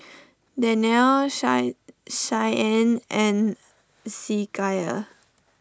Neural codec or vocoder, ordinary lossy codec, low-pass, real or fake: none; none; none; real